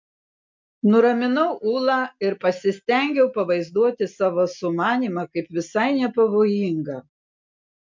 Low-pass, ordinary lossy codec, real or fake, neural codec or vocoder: 7.2 kHz; MP3, 64 kbps; real; none